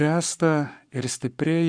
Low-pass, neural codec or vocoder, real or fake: 9.9 kHz; codec, 44.1 kHz, 7.8 kbps, Pupu-Codec; fake